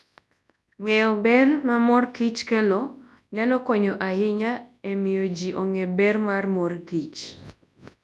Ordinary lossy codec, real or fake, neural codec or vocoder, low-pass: none; fake; codec, 24 kHz, 0.9 kbps, WavTokenizer, large speech release; none